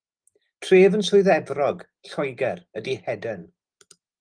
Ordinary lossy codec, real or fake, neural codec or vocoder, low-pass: Opus, 24 kbps; real; none; 9.9 kHz